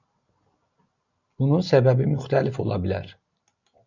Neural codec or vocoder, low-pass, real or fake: none; 7.2 kHz; real